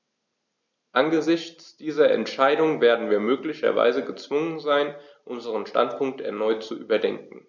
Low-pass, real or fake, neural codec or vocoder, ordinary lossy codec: 7.2 kHz; real; none; none